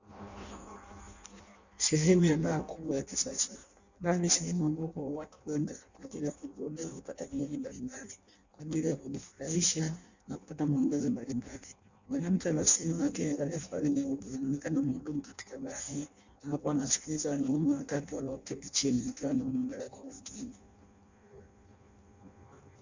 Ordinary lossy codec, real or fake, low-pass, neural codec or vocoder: Opus, 64 kbps; fake; 7.2 kHz; codec, 16 kHz in and 24 kHz out, 0.6 kbps, FireRedTTS-2 codec